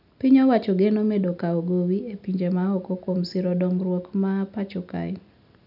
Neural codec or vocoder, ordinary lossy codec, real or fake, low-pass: none; none; real; 5.4 kHz